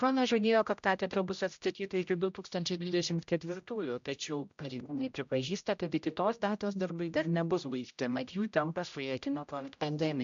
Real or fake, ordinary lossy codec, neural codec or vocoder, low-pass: fake; MP3, 64 kbps; codec, 16 kHz, 0.5 kbps, X-Codec, HuBERT features, trained on general audio; 7.2 kHz